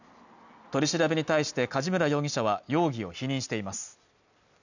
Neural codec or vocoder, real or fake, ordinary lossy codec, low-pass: none; real; none; 7.2 kHz